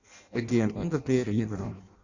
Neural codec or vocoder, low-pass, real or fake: codec, 16 kHz in and 24 kHz out, 0.6 kbps, FireRedTTS-2 codec; 7.2 kHz; fake